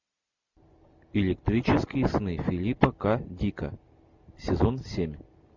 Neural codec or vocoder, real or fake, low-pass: none; real; 7.2 kHz